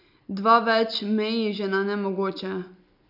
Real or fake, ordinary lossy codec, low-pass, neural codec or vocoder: real; none; 5.4 kHz; none